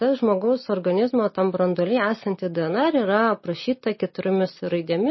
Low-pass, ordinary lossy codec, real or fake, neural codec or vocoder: 7.2 kHz; MP3, 24 kbps; real; none